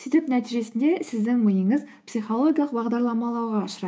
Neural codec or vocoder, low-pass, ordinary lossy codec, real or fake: codec, 16 kHz, 6 kbps, DAC; none; none; fake